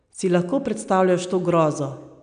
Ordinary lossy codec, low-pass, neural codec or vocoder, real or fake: none; 9.9 kHz; none; real